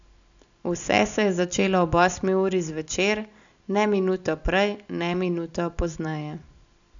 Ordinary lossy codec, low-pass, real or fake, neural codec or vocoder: none; 7.2 kHz; real; none